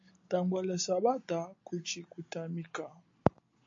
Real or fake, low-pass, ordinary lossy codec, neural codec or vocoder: real; 7.2 kHz; AAC, 48 kbps; none